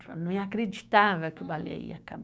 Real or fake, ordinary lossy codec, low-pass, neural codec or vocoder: fake; none; none; codec, 16 kHz, 6 kbps, DAC